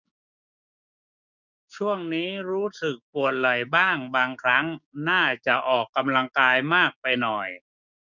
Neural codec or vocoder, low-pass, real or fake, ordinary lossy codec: codec, 16 kHz, 6 kbps, DAC; 7.2 kHz; fake; none